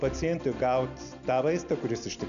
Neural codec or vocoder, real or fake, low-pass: none; real; 7.2 kHz